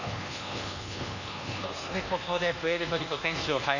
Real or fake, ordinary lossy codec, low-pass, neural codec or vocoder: fake; none; 7.2 kHz; codec, 24 kHz, 1.2 kbps, DualCodec